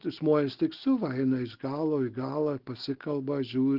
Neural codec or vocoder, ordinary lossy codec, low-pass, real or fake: none; Opus, 16 kbps; 5.4 kHz; real